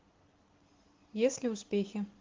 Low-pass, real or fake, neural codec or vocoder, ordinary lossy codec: 7.2 kHz; real; none; Opus, 16 kbps